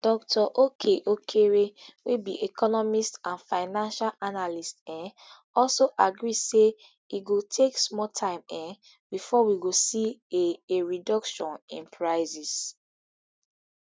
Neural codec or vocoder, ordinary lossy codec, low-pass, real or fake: none; none; none; real